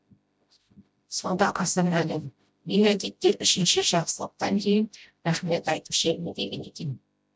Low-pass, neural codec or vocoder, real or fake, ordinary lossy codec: none; codec, 16 kHz, 0.5 kbps, FreqCodec, smaller model; fake; none